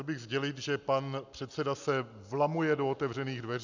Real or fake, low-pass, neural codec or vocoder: real; 7.2 kHz; none